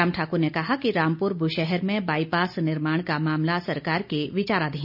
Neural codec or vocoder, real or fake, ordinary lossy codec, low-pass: none; real; none; 5.4 kHz